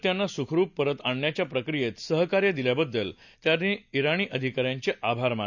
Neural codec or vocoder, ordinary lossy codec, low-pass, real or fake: none; none; 7.2 kHz; real